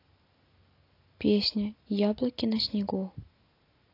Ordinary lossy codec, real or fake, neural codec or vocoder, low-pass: AAC, 32 kbps; real; none; 5.4 kHz